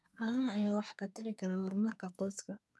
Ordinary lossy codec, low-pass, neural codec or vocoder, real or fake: none; none; codec, 24 kHz, 1 kbps, SNAC; fake